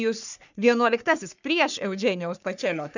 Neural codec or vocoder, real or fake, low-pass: codec, 44.1 kHz, 3.4 kbps, Pupu-Codec; fake; 7.2 kHz